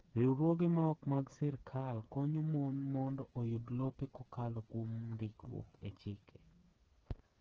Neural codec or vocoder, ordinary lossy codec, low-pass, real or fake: codec, 16 kHz, 4 kbps, FreqCodec, smaller model; Opus, 16 kbps; 7.2 kHz; fake